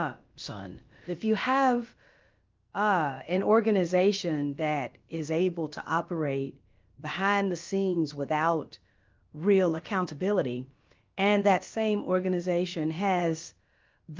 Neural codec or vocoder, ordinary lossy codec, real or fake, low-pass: codec, 16 kHz, about 1 kbps, DyCAST, with the encoder's durations; Opus, 32 kbps; fake; 7.2 kHz